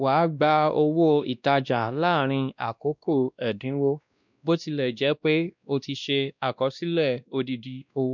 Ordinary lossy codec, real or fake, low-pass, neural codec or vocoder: none; fake; 7.2 kHz; codec, 16 kHz, 1 kbps, X-Codec, WavLM features, trained on Multilingual LibriSpeech